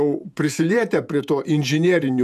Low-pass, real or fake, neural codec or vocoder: 14.4 kHz; fake; vocoder, 48 kHz, 128 mel bands, Vocos